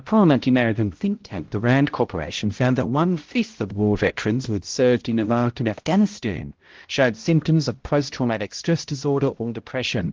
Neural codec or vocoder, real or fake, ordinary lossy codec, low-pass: codec, 16 kHz, 0.5 kbps, X-Codec, HuBERT features, trained on balanced general audio; fake; Opus, 16 kbps; 7.2 kHz